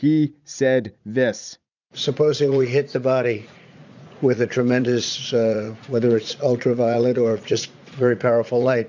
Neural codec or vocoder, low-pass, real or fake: vocoder, 44.1 kHz, 80 mel bands, Vocos; 7.2 kHz; fake